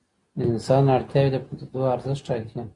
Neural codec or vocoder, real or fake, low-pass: none; real; 10.8 kHz